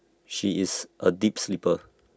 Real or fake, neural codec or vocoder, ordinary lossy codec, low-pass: real; none; none; none